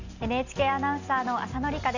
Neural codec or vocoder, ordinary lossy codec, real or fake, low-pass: none; none; real; 7.2 kHz